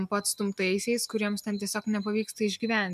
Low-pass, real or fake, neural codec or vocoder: 14.4 kHz; real; none